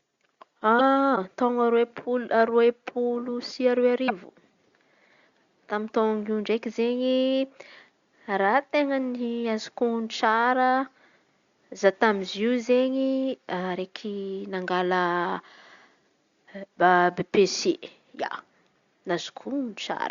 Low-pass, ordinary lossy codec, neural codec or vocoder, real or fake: 7.2 kHz; Opus, 64 kbps; none; real